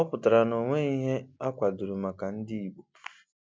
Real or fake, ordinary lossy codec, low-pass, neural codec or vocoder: real; none; none; none